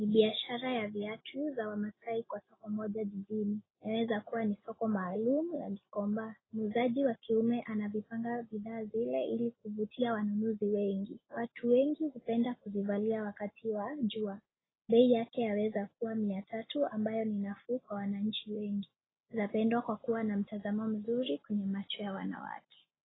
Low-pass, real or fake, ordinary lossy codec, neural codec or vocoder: 7.2 kHz; real; AAC, 16 kbps; none